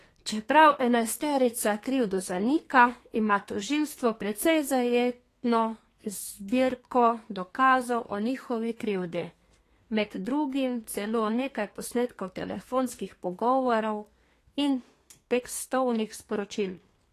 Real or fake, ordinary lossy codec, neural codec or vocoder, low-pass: fake; AAC, 48 kbps; codec, 32 kHz, 1.9 kbps, SNAC; 14.4 kHz